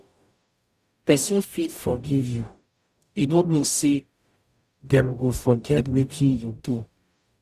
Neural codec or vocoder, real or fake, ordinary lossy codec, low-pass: codec, 44.1 kHz, 0.9 kbps, DAC; fake; Opus, 64 kbps; 14.4 kHz